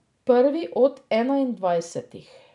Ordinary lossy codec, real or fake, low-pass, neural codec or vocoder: none; real; 10.8 kHz; none